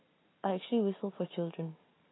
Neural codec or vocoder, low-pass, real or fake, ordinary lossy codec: none; 7.2 kHz; real; AAC, 16 kbps